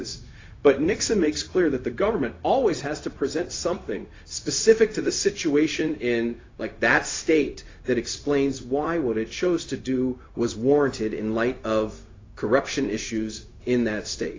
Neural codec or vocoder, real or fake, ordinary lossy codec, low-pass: codec, 16 kHz, 0.4 kbps, LongCat-Audio-Codec; fake; AAC, 32 kbps; 7.2 kHz